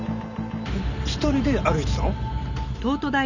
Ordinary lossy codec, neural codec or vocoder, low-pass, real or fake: none; none; 7.2 kHz; real